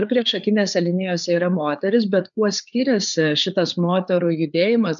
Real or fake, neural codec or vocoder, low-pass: fake; codec, 16 kHz, 4 kbps, FreqCodec, larger model; 7.2 kHz